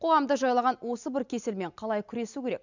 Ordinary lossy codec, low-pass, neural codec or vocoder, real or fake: none; 7.2 kHz; none; real